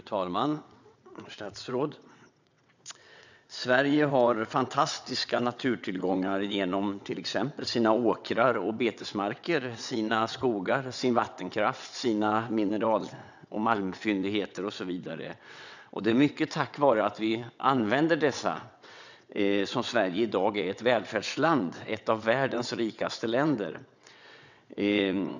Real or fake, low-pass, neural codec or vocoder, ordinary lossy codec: fake; 7.2 kHz; vocoder, 22.05 kHz, 80 mel bands, WaveNeXt; none